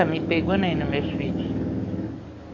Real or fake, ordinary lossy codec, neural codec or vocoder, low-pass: fake; none; codec, 44.1 kHz, 7.8 kbps, DAC; 7.2 kHz